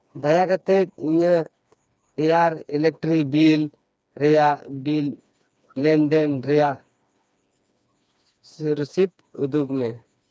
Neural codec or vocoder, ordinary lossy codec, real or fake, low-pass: codec, 16 kHz, 2 kbps, FreqCodec, smaller model; none; fake; none